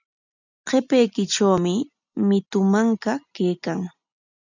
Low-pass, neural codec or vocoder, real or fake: 7.2 kHz; none; real